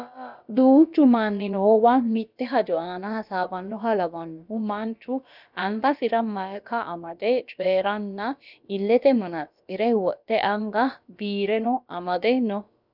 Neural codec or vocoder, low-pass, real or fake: codec, 16 kHz, about 1 kbps, DyCAST, with the encoder's durations; 5.4 kHz; fake